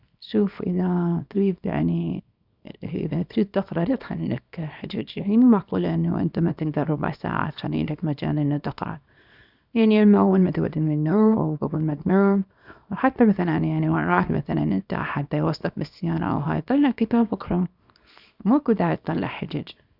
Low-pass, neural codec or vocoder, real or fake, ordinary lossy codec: 5.4 kHz; codec, 24 kHz, 0.9 kbps, WavTokenizer, small release; fake; none